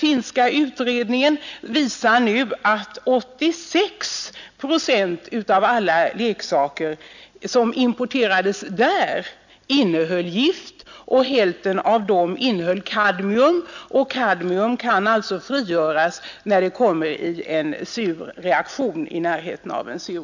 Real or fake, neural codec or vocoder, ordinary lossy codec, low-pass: real; none; none; 7.2 kHz